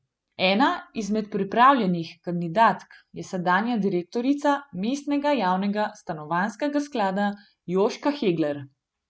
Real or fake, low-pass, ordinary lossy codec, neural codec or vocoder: real; none; none; none